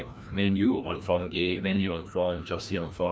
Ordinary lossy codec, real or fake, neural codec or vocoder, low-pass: none; fake; codec, 16 kHz, 1 kbps, FreqCodec, larger model; none